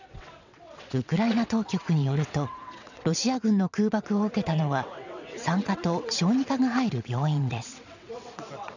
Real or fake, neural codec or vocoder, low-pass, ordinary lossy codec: fake; vocoder, 22.05 kHz, 80 mel bands, WaveNeXt; 7.2 kHz; none